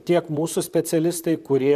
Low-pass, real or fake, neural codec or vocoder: 14.4 kHz; fake; vocoder, 44.1 kHz, 128 mel bands, Pupu-Vocoder